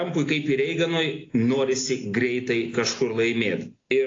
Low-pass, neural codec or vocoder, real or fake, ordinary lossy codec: 7.2 kHz; none; real; AAC, 32 kbps